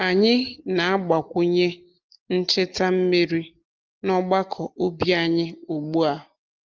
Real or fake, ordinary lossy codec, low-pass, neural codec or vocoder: real; Opus, 32 kbps; 7.2 kHz; none